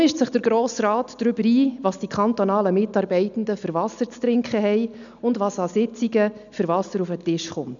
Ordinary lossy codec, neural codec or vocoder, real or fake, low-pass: none; none; real; 7.2 kHz